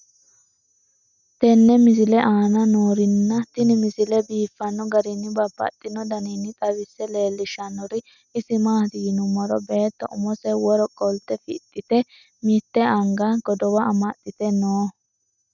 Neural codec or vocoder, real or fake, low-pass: none; real; 7.2 kHz